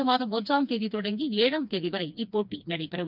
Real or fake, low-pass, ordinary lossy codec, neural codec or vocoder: fake; 5.4 kHz; none; codec, 16 kHz, 2 kbps, FreqCodec, smaller model